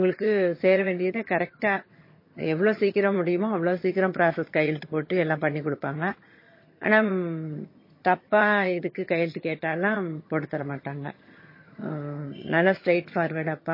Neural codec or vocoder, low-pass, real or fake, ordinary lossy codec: vocoder, 22.05 kHz, 80 mel bands, HiFi-GAN; 5.4 kHz; fake; MP3, 24 kbps